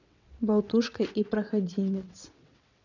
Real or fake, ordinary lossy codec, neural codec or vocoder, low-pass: fake; none; vocoder, 22.05 kHz, 80 mel bands, WaveNeXt; 7.2 kHz